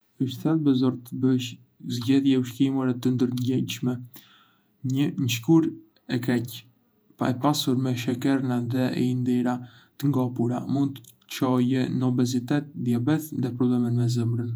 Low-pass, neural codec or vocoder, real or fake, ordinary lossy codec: none; vocoder, 48 kHz, 128 mel bands, Vocos; fake; none